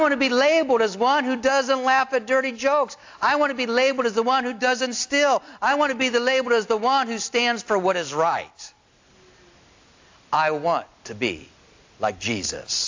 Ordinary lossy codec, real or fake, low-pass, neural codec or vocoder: AAC, 48 kbps; real; 7.2 kHz; none